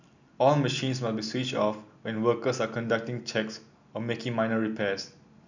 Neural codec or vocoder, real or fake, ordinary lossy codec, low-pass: none; real; none; 7.2 kHz